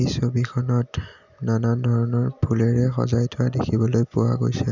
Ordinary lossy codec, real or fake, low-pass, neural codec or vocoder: none; real; 7.2 kHz; none